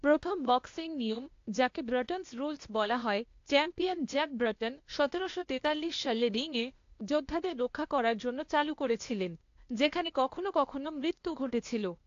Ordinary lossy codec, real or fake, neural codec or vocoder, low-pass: AAC, 48 kbps; fake; codec, 16 kHz, 0.8 kbps, ZipCodec; 7.2 kHz